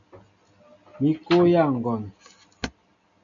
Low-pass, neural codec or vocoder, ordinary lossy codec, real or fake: 7.2 kHz; none; MP3, 96 kbps; real